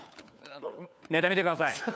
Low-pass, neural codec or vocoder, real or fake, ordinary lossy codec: none; codec, 16 kHz, 4 kbps, FunCodec, trained on LibriTTS, 50 frames a second; fake; none